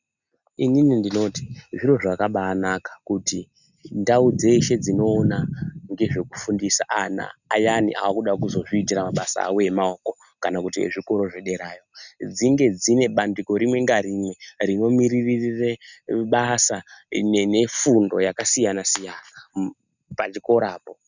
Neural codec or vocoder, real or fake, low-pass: none; real; 7.2 kHz